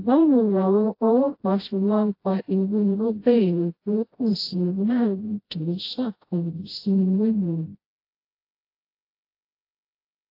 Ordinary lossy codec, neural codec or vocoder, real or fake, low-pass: AAC, 32 kbps; codec, 16 kHz, 0.5 kbps, FreqCodec, smaller model; fake; 5.4 kHz